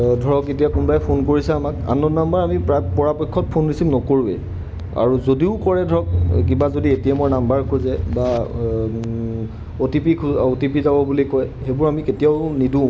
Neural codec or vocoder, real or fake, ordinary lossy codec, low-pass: none; real; none; none